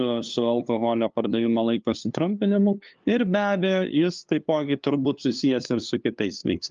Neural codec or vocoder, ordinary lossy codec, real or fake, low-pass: codec, 16 kHz, 2 kbps, FunCodec, trained on LibriTTS, 25 frames a second; Opus, 24 kbps; fake; 7.2 kHz